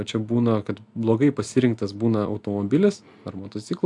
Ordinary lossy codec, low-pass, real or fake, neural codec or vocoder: AAC, 64 kbps; 10.8 kHz; real; none